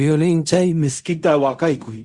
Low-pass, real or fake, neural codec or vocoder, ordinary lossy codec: 10.8 kHz; fake; codec, 16 kHz in and 24 kHz out, 0.4 kbps, LongCat-Audio-Codec, fine tuned four codebook decoder; Opus, 64 kbps